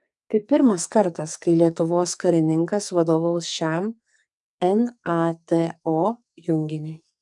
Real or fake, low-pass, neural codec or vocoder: fake; 10.8 kHz; codec, 44.1 kHz, 2.6 kbps, SNAC